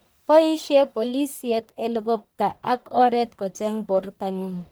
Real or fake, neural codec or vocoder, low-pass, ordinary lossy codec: fake; codec, 44.1 kHz, 1.7 kbps, Pupu-Codec; none; none